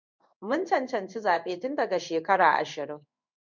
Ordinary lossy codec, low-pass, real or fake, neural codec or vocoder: MP3, 64 kbps; 7.2 kHz; fake; codec, 16 kHz in and 24 kHz out, 1 kbps, XY-Tokenizer